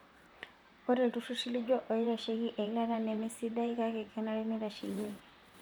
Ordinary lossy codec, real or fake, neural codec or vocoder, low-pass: none; fake; vocoder, 44.1 kHz, 128 mel bands, Pupu-Vocoder; none